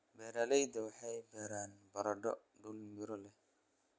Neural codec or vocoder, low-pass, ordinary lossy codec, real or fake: none; none; none; real